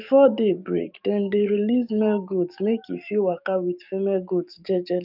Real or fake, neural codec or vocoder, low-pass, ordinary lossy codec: fake; codec, 44.1 kHz, 7.8 kbps, DAC; 5.4 kHz; none